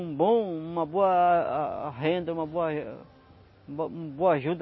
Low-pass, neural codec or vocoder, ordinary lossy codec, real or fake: 7.2 kHz; none; MP3, 24 kbps; real